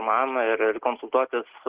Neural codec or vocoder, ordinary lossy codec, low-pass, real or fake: none; Opus, 16 kbps; 3.6 kHz; real